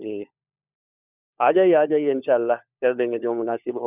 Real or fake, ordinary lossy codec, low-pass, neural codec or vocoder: fake; none; 3.6 kHz; codec, 16 kHz, 4 kbps, FunCodec, trained on LibriTTS, 50 frames a second